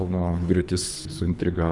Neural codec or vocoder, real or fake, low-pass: codec, 24 kHz, 3 kbps, HILCodec; fake; 10.8 kHz